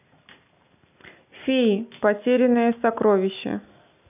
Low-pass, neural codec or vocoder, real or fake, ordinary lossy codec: 3.6 kHz; none; real; none